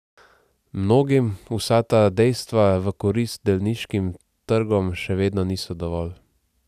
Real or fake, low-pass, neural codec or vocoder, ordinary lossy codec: real; 14.4 kHz; none; none